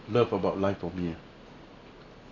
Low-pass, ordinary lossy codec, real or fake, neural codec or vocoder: 7.2 kHz; MP3, 64 kbps; real; none